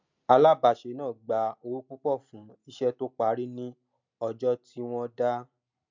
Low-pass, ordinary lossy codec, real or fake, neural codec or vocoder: 7.2 kHz; MP3, 64 kbps; real; none